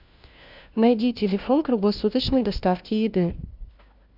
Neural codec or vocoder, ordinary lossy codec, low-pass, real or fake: codec, 16 kHz, 1 kbps, FunCodec, trained on LibriTTS, 50 frames a second; Opus, 64 kbps; 5.4 kHz; fake